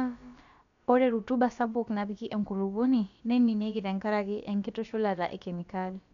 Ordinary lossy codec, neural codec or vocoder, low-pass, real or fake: none; codec, 16 kHz, about 1 kbps, DyCAST, with the encoder's durations; 7.2 kHz; fake